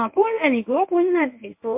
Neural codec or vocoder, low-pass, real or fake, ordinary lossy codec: codec, 24 kHz, 0.9 kbps, WavTokenizer, medium speech release version 1; 3.6 kHz; fake; MP3, 32 kbps